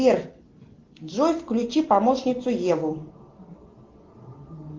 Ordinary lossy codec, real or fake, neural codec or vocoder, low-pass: Opus, 16 kbps; real; none; 7.2 kHz